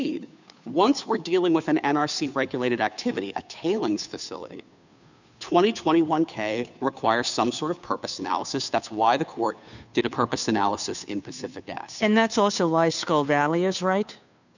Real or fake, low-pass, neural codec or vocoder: fake; 7.2 kHz; codec, 16 kHz, 2 kbps, FunCodec, trained on Chinese and English, 25 frames a second